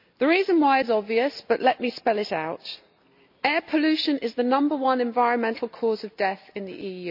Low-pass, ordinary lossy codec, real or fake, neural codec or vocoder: 5.4 kHz; MP3, 48 kbps; real; none